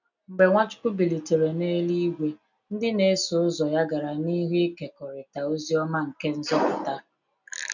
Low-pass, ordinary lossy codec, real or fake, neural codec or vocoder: 7.2 kHz; none; real; none